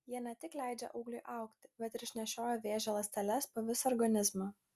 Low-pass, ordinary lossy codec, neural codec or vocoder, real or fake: 14.4 kHz; Opus, 64 kbps; none; real